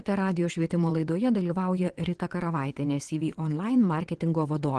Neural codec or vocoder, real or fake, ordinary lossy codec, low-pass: vocoder, 22.05 kHz, 80 mel bands, WaveNeXt; fake; Opus, 16 kbps; 9.9 kHz